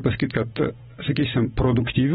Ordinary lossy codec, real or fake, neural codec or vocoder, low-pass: AAC, 16 kbps; real; none; 19.8 kHz